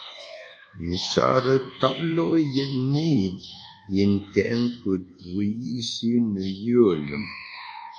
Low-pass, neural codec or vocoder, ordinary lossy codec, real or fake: 9.9 kHz; codec, 24 kHz, 1.2 kbps, DualCodec; AAC, 64 kbps; fake